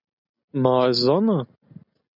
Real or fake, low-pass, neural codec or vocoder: real; 5.4 kHz; none